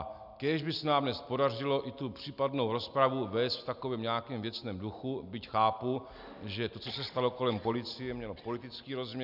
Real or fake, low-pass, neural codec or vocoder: real; 5.4 kHz; none